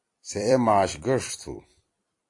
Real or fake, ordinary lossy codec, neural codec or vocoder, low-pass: fake; AAC, 48 kbps; vocoder, 44.1 kHz, 128 mel bands every 256 samples, BigVGAN v2; 10.8 kHz